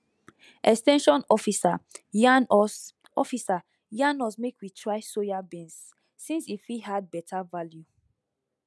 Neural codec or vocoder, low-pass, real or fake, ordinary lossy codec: none; none; real; none